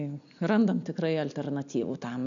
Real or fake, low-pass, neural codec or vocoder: real; 7.2 kHz; none